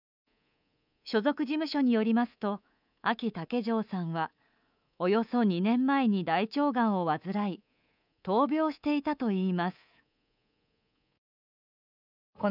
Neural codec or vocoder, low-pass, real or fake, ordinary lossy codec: codec, 24 kHz, 3.1 kbps, DualCodec; 5.4 kHz; fake; none